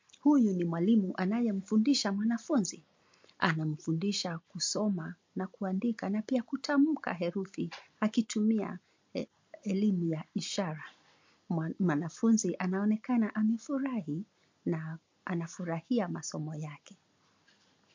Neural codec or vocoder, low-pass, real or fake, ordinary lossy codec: none; 7.2 kHz; real; MP3, 48 kbps